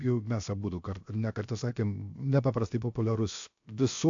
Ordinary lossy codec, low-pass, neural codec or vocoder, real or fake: AAC, 64 kbps; 7.2 kHz; codec, 16 kHz, 0.7 kbps, FocalCodec; fake